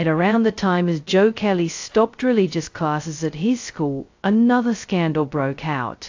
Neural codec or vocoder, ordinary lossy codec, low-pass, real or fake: codec, 16 kHz, 0.2 kbps, FocalCodec; AAC, 48 kbps; 7.2 kHz; fake